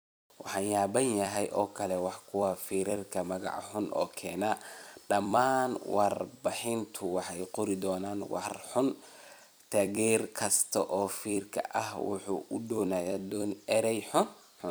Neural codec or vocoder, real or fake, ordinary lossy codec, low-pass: vocoder, 44.1 kHz, 128 mel bands every 256 samples, BigVGAN v2; fake; none; none